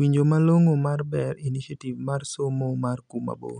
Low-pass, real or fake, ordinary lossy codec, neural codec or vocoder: 9.9 kHz; real; none; none